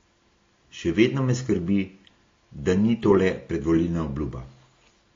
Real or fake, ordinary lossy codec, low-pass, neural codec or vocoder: real; AAC, 32 kbps; 7.2 kHz; none